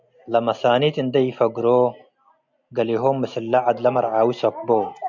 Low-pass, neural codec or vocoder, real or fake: 7.2 kHz; none; real